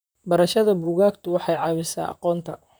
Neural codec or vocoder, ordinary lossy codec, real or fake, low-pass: vocoder, 44.1 kHz, 128 mel bands, Pupu-Vocoder; none; fake; none